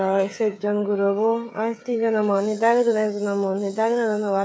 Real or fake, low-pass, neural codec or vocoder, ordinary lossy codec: fake; none; codec, 16 kHz, 16 kbps, FreqCodec, smaller model; none